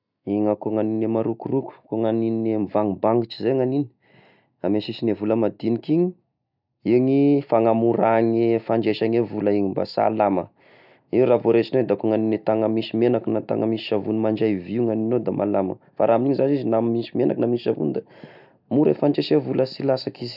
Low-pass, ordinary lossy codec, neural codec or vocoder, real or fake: 5.4 kHz; none; none; real